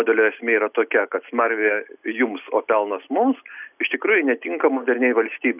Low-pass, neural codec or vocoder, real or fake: 3.6 kHz; none; real